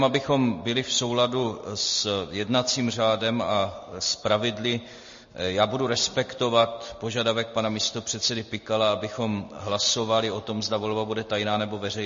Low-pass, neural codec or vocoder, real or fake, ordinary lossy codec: 7.2 kHz; none; real; MP3, 32 kbps